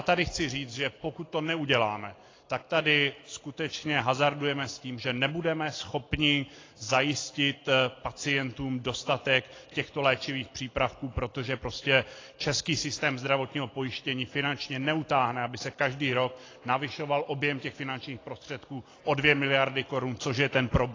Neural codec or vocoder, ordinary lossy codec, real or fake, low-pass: none; AAC, 32 kbps; real; 7.2 kHz